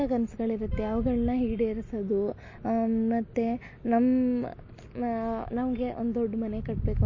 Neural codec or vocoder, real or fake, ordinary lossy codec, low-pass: none; real; MP3, 32 kbps; 7.2 kHz